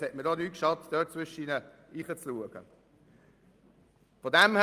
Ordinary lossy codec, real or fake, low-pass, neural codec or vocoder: Opus, 32 kbps; real; 14.4 kHz; none